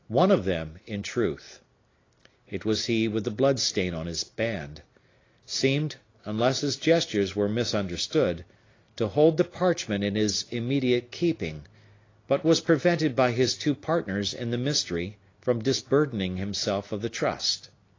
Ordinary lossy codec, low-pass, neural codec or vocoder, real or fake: AAC, 32 kbps; 7.2 kHz; none; real